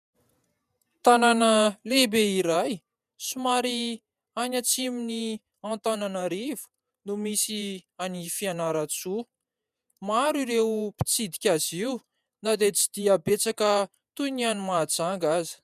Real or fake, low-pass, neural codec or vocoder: fake; 14.4 kHz; vocoder, 48 kHz, 128 mel bands, Vocos